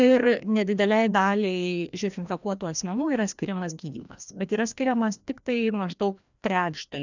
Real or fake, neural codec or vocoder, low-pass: fake; codec, 16 kHz, 1 kbps, FreqCodec, larger model; 7.2 kHz